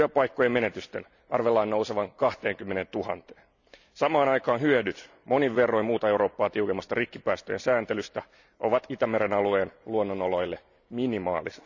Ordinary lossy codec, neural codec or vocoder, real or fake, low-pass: none; none; real; 7.2 kHz